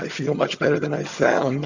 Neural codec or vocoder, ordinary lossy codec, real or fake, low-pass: vocoder, 22.05 kHz, 80 mel bands, HiFi-GAN; Opus, 64 kbps; fake; 7.2 kHz